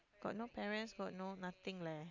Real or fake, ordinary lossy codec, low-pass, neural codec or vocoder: real; none; 7.2 kHz; none